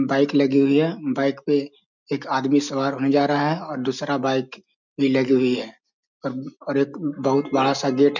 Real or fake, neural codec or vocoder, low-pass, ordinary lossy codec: real; none; 7.2 kHz; none